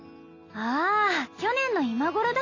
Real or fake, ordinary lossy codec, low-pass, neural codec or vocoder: real; AAC, 32 kbps; 7.2 kHz; none